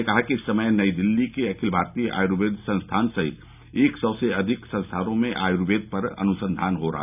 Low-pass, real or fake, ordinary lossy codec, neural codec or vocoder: 3.6 kHz; real; none; none